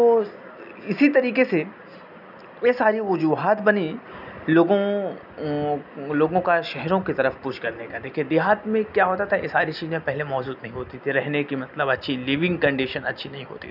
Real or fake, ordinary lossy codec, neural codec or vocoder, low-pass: real; none; none; 5.4 kHz